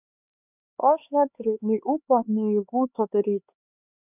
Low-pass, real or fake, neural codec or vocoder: 3.6 kHz; fake; codec, 16 kHz, 4 kbps, X-Codec, HuBERT features, trained on LibriSpeech